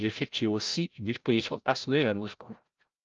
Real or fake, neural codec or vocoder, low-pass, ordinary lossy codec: fake; codec, 16 kHz, 0.5 kbps, FreqCodec, larger model; 7.2 kHz; Opus, 24 kbps